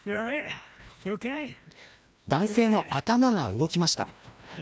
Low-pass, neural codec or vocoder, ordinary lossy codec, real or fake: none; codec, 16 kHz, 1 kbps, FreqCodec, larger model; none; fake